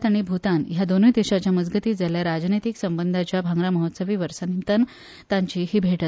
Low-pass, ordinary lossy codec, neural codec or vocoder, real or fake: none; none; none; real